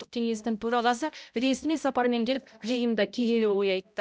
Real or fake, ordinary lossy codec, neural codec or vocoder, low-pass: fake; none; codec, 16 kHz, 0.5 kbps, X-Codec, HuBERT features, trained on balanced general audio; none